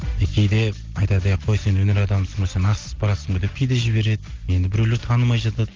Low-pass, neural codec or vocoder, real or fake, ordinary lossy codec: 7.2 kHz; none; real; Opus, 16 kbps